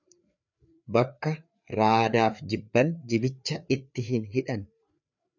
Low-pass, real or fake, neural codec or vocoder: 7.2 kHz; fake; codec, 16 kHz, 4 kbps, FreqCodec, larger model